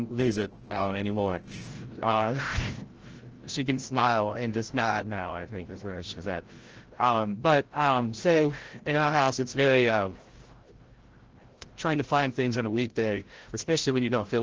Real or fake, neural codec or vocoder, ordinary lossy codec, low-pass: fake; codec, 16 kHz, 0.5 kbps, FreqCodec, larger model; Opus, 16 kbps; 7.2 kHz